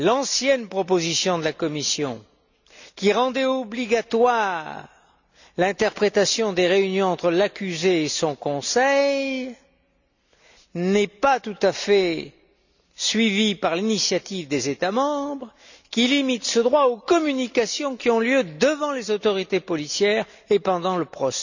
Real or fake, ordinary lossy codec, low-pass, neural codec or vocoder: real; none; 7.2 kHz; none